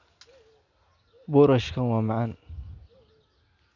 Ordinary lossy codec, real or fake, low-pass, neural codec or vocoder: none; real; 7.2 kHz; none